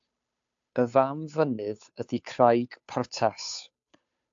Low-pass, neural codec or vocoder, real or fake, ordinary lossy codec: 7.2 kHz; codec, 16 kHz, 2 kbps, FunCodec, trained on Chinese and English, 25 frames a second; fake; AAC, 64 kbps